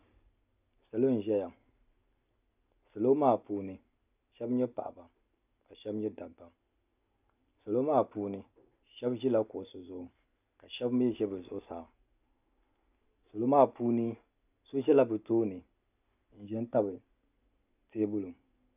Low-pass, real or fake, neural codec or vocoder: 3.6 kHz; real; none